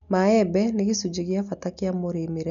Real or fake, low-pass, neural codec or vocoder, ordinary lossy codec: real; 7.2 kHz; none; none